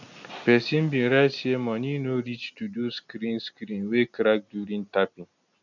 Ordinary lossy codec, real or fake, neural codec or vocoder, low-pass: none; real; none; 7.2 kHz